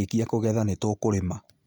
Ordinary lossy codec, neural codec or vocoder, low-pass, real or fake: none; none; none; real